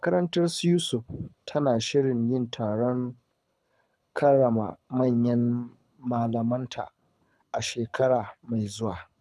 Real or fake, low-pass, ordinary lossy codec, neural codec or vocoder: fake; none; none; codec, 24 kHz, 6 kbps, HILCodec